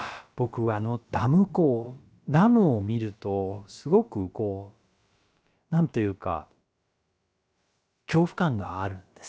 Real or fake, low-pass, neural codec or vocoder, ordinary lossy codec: fake; none; codec, 16 kHz, about 1 kbps, DyCAST, with the encoder's durations; none